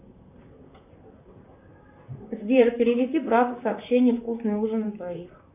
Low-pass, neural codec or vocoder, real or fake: 3.6 kHz; vocoder, 44.1 kHz, 128 mel bands, Pupu-Vocoder; fake